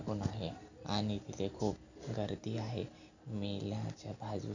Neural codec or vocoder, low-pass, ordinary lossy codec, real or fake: none; 7.2 kHz; none; real